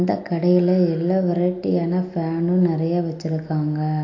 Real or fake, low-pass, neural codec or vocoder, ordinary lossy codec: real; 7.2 kHz; none; AAC, 32 kbps